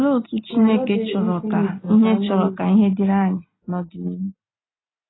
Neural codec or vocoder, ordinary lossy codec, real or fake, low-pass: none; AAC, 16 kbps; real; 7.2 kHz